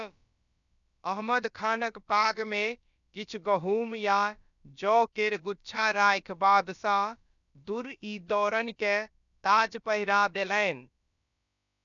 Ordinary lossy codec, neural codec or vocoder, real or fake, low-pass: none; codec, 16 kHz, about 1 kbps, DyCAST, with the encoder's durations; fake; 7.2 kHz